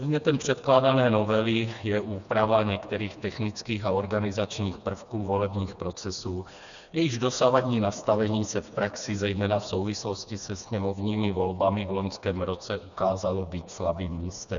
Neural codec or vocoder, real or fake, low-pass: codec, 16 kHz, 2 kbps, FreqCodec, smaller model; fake; 7.2 kHz